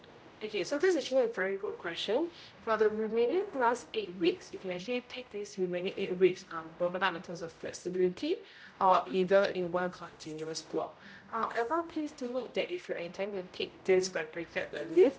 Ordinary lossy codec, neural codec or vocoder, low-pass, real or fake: none; codec, 16 kHz, 0.5 kbps, X-Codec, HuBERT features, trained on general audio; none; fake